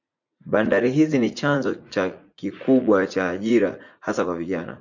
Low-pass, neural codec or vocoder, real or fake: 7.2 kHz; vocoder, 44.1 kHz, 80 mel bands, Vocos; fake